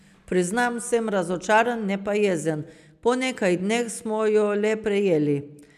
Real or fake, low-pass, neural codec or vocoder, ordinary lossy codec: real; 14.4 kHz; none; none